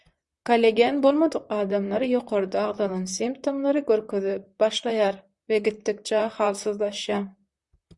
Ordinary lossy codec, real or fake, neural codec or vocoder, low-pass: Opus, 64 kbps; fake; vocoder, 44.1 kHz, 128 mel bands, Pupu-Vocoder; 10.8 kHz